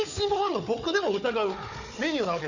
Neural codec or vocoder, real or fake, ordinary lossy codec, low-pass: codec, 16 kHz, 4 kbps, FunCodec, trained on Chinese and English, 50 frames a second; fake; none; 7.2 kHz